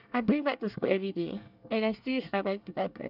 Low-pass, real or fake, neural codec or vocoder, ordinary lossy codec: 5.4 kHz; fake; codec, 24 kHz, 1 kbps, SNAC; none